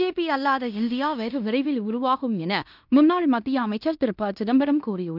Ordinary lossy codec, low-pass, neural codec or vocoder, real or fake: none; 5.4 kHz; codec, 16 kHz in and 24 kHz out, 0.9 kbps, LongCat-Audio-Codec, fine tuned four codebook decoder; fake